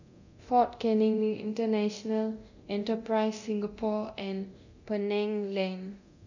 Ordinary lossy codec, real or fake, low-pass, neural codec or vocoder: none; fake; 7.2 kHz; codec, 24 kHz, 0.9 kbps, DualCodec